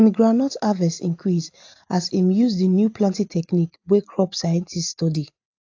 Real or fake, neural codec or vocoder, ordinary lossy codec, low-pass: real; none; AAC, 48 kbps; 7.2 kHz